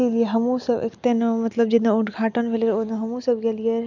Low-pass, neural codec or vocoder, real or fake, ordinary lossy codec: 7.2 kHz; none; real; none